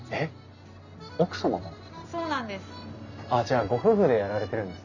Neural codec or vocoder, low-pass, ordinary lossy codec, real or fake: none; 7.2 kHz; none; real